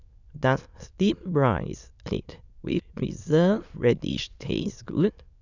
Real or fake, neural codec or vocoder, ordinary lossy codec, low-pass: fake; autoencoder, 22.05 kHz, a latent of 192 numbers a frame, VITS, trained on many speakers; none; 7.2 kHz